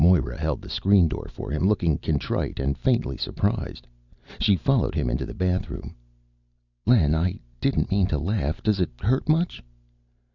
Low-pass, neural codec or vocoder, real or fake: 7.2 kHz; none; real